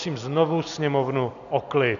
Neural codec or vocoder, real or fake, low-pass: none; real; 7.2 kHz